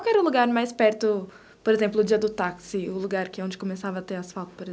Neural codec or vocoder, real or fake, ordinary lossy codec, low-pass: none; real; none; none